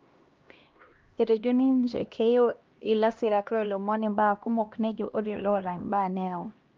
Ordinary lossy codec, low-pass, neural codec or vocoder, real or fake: Opus, 32 kbps; 7.2 kHz; codec, 16 kHz, 1 kbps, X-Codec, HuBERT features, trained on LibriSpeech; fake